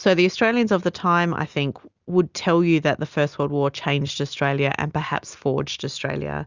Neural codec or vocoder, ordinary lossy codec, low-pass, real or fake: none; Opus, 64 kbps; 7.2 kHz; real